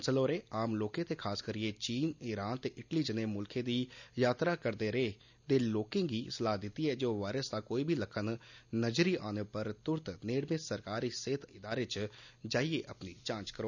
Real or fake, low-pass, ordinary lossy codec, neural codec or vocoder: real; 7.2 kHz; none; none